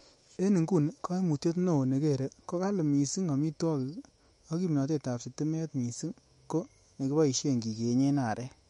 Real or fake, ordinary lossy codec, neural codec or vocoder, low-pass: fake; MP3, 48 kbps; autoencoder, 48 kHz, 128 numbers a frame, DAC-VAE, trained on Japanese speech; 19.8 kHz